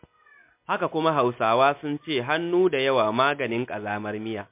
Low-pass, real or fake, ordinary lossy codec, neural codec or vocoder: 3.6 kHz; real; MP3, 32 kbps; none